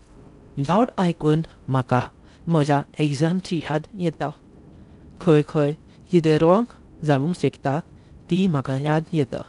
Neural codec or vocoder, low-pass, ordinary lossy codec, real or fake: codec, 16 kHz in and 24 kHz out, 0.6 kbps, FocalCodec, streaming, 4096 codes; 10.8 kHz; none; fake